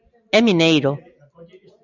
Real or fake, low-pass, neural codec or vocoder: real; 7.2 kHz; none